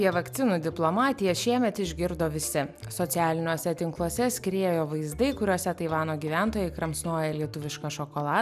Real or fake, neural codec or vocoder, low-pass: real; none; 14.4 kHz